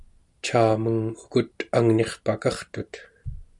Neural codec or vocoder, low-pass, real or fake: none; 10.8 kHz; real